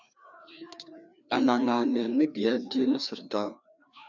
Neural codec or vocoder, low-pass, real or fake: codec, 16 kHz, 2 kbps, FreqCodec, larger model; 7.2 kHz; fake